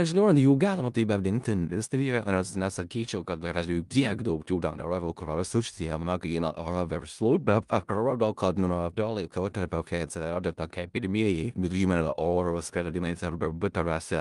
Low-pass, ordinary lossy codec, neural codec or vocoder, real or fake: 10.8 kHz; Opus, 32 kbps; codec, 16 kHz in and 24 kHz out, 0.4 kbps, LongCat-Audio-Codec, four codebook decoder; fake